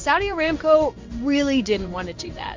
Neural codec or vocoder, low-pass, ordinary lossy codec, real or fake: codec, 16 kHz in and 24 kHz out, 1 kbps, XY-Tokenizer; 7.2 kHz; MP3, 48 kbps; fake